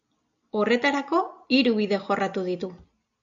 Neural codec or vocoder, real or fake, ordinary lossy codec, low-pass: none; real; AAC, 64 kbps; 7.2 kHz